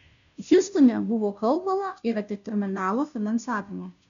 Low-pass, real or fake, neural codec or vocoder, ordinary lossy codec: 7.2 kHz; fake; codec, 16 kHz, 0.5 kbps, FunCodec, trained on Chinese and English, 25 frames a second; MP3, 96 kbps